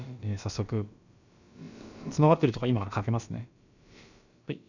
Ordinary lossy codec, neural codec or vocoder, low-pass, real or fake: none; codec, 16 kHz, about 1 kbps, DyCAST, with the encoder's durations; 7.2 kHz; fake